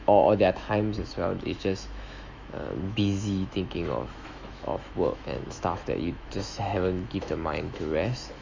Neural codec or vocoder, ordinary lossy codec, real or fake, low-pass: autoencoder, 48 kHz, 128 numbers a frame, DAC-VAE, trained on Japanese speech; MP3, 64 kbps; fake; 7.2 kHz